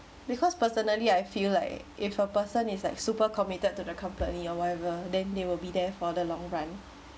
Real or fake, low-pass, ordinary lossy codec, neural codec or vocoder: real; none; none; none